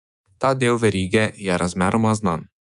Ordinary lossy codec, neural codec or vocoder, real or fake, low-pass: none; codec, 24 kHz, 3.1 kbps, DualCodec; fake; 10.8 kHz